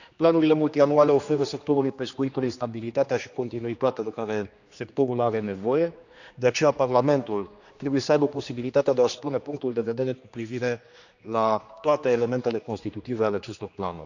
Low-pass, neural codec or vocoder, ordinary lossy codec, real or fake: 7.2 kHz; codec, 16 kHz, 2 kbps, X-Codec, HuBERT features, trained on general audio; none; fake